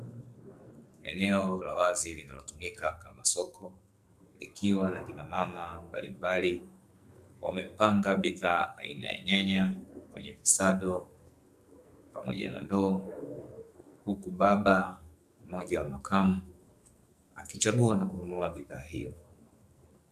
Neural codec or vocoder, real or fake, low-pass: codec, 44.1 kHz, 2.6 kbps, SNAC; fake; 14.4 kHz